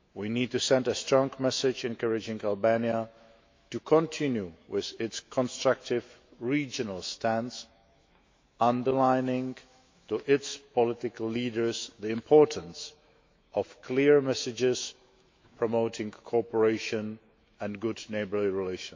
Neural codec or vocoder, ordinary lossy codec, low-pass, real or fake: autoencoder, 48 kHz, 128 numbers a frame, DAC-VAE, trained on Japanese speech; MP3, 48 kbps; 7.2 kHz; fake